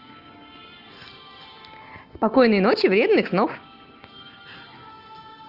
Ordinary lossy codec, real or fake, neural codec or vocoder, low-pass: Opus, 24 kbps; real; none; 5.4 kHz